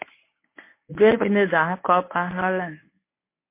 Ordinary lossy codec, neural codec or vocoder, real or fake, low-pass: MP3, 24 kbps; codec, 24 kHz, 0.9 kbps, WavTokenizer, medium speech release version 1; fake; 3.6 kHz